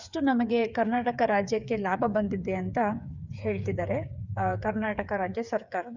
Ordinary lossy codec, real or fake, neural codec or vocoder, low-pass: none; fake; codec, 16 kHz, 8 kbps, FreqCodec, smaller model; 7.2 kHz